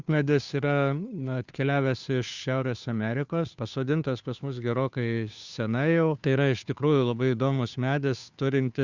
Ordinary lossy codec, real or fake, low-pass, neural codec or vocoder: Opus, 64 kbps; fake; 7.2 kHz; codec, 16 kHz, 2 kbps, FunCodec, trained on Chinese and English, 25 frames a second